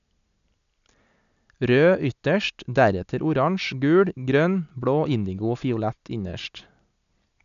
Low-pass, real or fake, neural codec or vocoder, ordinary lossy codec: 7.2 kHz; real; none; none